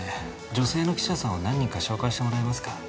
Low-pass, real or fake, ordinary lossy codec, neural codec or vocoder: none; real; none; none